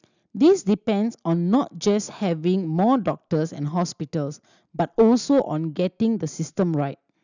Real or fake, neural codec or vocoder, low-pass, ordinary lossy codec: real; none; 7.2 kHz; none